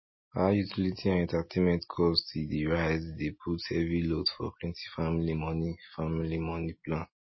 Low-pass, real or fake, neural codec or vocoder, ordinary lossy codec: 7.2 kHz; real; none; MP3, 24 kbps